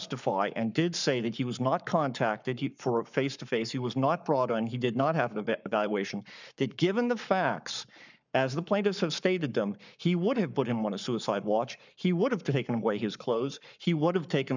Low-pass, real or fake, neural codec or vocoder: 7.2 kHz; fake; codec, 44.1 kHz, 7.8 kbps, Pupu-Codec